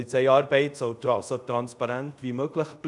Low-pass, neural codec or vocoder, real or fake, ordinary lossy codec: 10.8 kHz; codec, 24 kHz, 0.5 kbps, DualCodec; fake; none